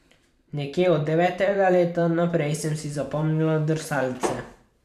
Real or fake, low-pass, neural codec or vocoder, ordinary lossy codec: fake; 14.4 kHz; vocoder, 44.1 kHz, 128 mel bands every 512 samples, BigVGAN v2; none